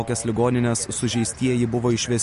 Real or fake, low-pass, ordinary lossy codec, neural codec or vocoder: real; 10.8 kHz; MP3, 48 kbps; none